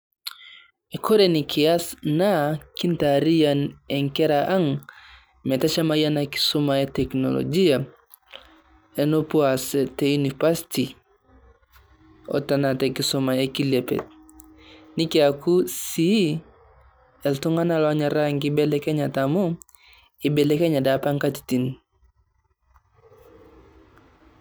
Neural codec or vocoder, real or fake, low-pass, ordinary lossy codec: none; real; none; none